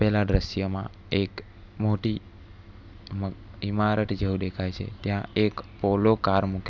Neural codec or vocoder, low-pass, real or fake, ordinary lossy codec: none; 7.2 kHz; real; none